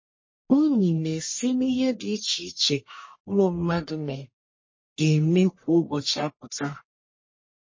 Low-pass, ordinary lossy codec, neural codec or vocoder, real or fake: 7.2 kHz; MP3, 32 kbps; codec, 44.1 kHz, 1.7 kbps, Pupu-Codec; fake